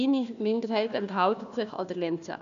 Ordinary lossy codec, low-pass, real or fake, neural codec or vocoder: AAC, 96 kbps; 7.2 kHz; fake; codec, 16 kHz, 1 kbps, FunCodec, trained on Chinese and English, 50 frames a second